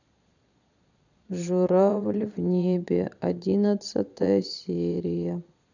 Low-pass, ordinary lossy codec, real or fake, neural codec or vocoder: 7.2 kHz; none; fake; vocoder, 22.05 kHz, 80 mel bands, Vocos